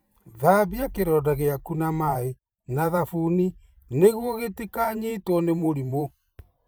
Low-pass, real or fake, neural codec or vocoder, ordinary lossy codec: none; fake; vocoder, 44.1 kHz, 128 mel bands every 512 samples, BigVGAN v2; none